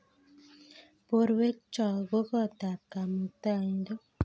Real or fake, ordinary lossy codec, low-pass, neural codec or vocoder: real; none; none; none